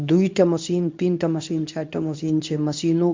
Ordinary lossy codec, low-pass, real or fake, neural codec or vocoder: MP3, 64 kbps; 7.2 kHz; fake; codec, 24 kHz, 0.9 kbps, WavTokenizer, medium speech release version 2